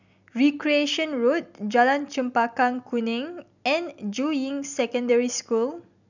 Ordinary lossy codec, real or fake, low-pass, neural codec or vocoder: none; real; 7.2 kHz; none